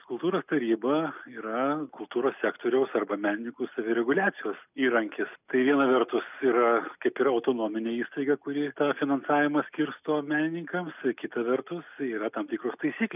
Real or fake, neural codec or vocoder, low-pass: real; none; 3.6 kHz